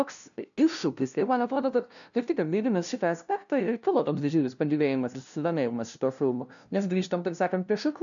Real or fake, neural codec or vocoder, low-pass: fake; codec, 16 kHz, 0.5 kbps, FunCodec, trained on LibriTTS, 25 frames a second; 7.2 kHz